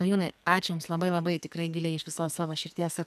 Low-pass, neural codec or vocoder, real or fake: 14.4 kHz; codec, 44.1 kHz, 2.6 kbps, SNAC; fake